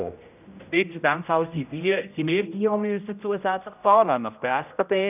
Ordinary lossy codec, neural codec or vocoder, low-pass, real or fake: none; codec, 16 kHz, 0.5 kbps, X-Codec, HuBERT features, trained on general audio; 3.6 kHz; fake